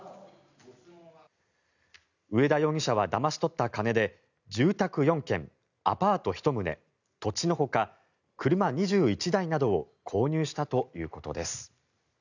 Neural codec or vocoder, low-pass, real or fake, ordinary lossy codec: none; 7.2 kHz; real; none